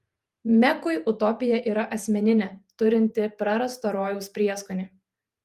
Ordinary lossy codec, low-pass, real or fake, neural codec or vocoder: Opus, 32 kbps; 14.4 kHz; fake; vocoder, 48 kHz, 128 mel bands, Vocos